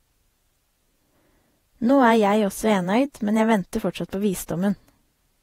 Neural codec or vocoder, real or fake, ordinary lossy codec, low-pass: vocoder, 48 kHz, 128 mel bands, Vocos; fake; AAC, 48 kbps; 19.8 kHz